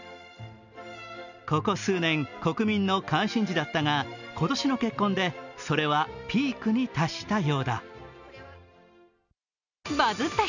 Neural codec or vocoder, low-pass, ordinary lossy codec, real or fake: none; 7.2 kHz; none; real